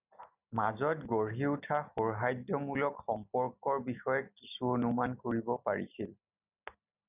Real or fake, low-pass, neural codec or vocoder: real; 3.6 kHz; none